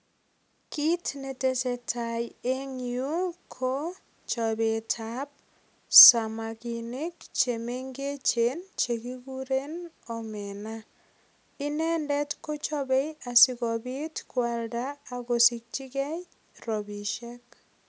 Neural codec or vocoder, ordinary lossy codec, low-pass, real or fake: none; none; none; real